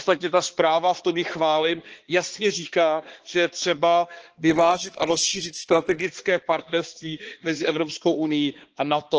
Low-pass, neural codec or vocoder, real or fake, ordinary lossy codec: 7.2 kHz; codec, 16 kHz, 2 kbps, X-Codec, HuBERT features, trained on balanced general audio; fake; Opus, 16 kbps